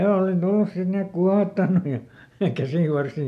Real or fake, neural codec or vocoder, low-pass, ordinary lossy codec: real; none; 14.4 kHz; none